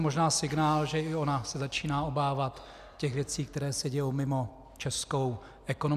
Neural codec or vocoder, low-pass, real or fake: none; 14.4 kHz; real